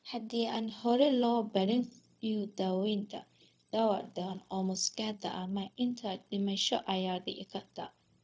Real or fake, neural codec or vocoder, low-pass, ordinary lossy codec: fake; codec, 16 kHz, 0.4 kbps, LongCat-Audio-Codec; none; none